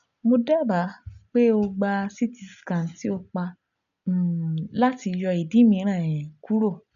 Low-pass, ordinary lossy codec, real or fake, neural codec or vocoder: 7.2 kHz; none; real; none